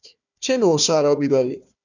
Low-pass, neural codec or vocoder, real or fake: 7.2 kHz; codec, 16 kHz, 1 kbps, FunCodec, trained on Chinese and English, 50 frames a second; fake